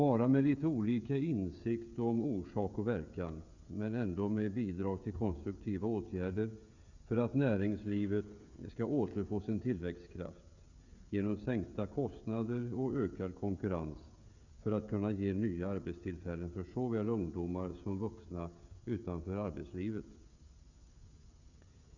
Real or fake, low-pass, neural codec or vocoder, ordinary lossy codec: fake; 7.2 kHz; codec, 16 kHz, 16 kbps, FreqCodec, smaller model; Opus, 64 kbps